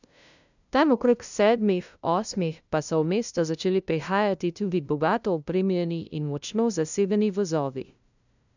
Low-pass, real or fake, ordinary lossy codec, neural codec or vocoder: 7.2 kHz; fake; none; codec, 16 kHz, 0.5 kbps, FunCodec, trained on LibriTTS, 25 frames a second